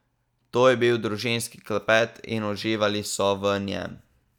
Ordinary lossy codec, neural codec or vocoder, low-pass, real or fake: none; none; 19.8 kHz; real